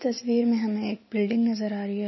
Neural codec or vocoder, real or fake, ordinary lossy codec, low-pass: none; real; MP3, 24 kbps; 7.2 kHz